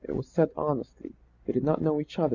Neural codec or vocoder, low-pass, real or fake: none; 7.2 kHz; real